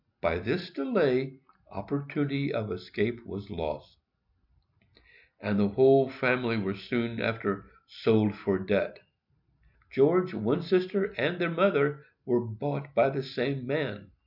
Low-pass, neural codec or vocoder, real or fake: 5.4 kHz; none; real